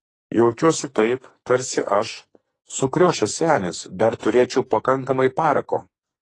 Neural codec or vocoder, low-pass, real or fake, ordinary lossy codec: codec, 44.1 kHz, 2.6 kbps, SNAC; 10.8 kHz; fake; AAC, 32 kbps